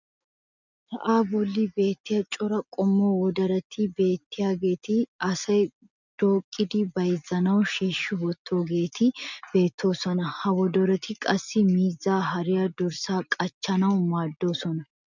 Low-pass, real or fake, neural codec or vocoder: 7.2 kHz; real; none